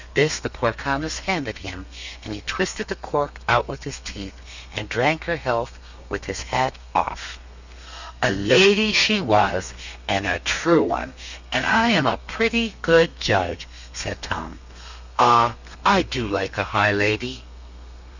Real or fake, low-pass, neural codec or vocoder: fake; 7.2 kHz; codec, 32 kHz, 1.9 kbps, SNAC